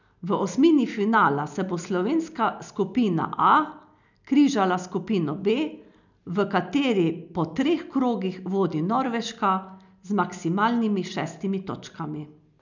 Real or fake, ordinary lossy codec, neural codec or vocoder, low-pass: real; none; none; 7.2 kHz